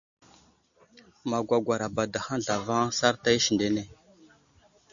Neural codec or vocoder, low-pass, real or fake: none; 7.2 kHz; real